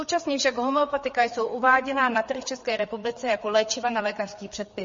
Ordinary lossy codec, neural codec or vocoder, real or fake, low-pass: MP3, 32 kbps; codec, 16 kHz, 4 kbps, FreqCodec, larger model; fake; 7.2 kHz